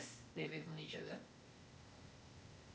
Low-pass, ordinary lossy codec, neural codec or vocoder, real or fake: none; none; codec, 16 kHz, 0.8 kbps, ZipCodec; fake